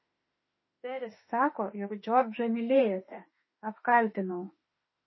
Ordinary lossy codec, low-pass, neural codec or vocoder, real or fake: MP3, 24 kbps; 7.2 kHz; autoencoder, 48 kHz, 32 numbers a frame, DAC-VAE, trained on Japanese speech; fake